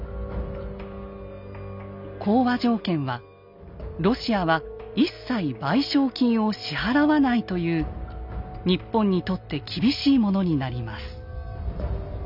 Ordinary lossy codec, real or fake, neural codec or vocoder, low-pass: none; real; none; 5.4 kHz